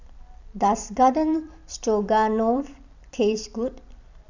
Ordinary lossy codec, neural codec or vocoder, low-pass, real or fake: none; vocoder, 44.1 kHz, 128 mel bands every 256 samples, BigVGAN v2; 7.2 kHz; fake